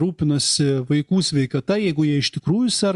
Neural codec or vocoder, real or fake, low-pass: none; real; 10.8 kHz